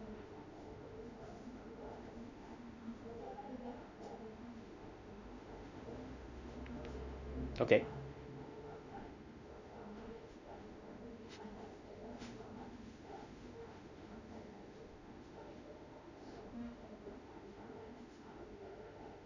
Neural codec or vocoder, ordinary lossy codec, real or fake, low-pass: autoencoder, 48 kHz, 32 numbers a frame, DAC-VAE, trained on Japanese speech; none; fake; 7.2 kHz